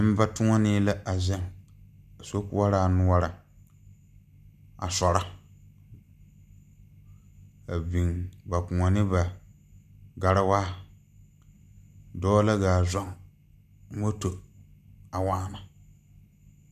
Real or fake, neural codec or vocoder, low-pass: real; none; 14.4 kHz